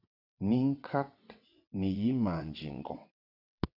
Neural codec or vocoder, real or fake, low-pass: vocoder, 22.05 kHz, 80 mel bands, WaveNeXt; fake; 5.4 kHz